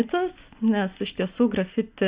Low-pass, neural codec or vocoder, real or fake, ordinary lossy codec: 3.6 kHz; none; real; Opus, 64 kbps